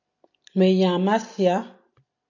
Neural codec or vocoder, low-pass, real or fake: vocoder, 44.1 kHz, 128 mel bands every 256 samples, BigVGAN v2; 7.2 kHz; fake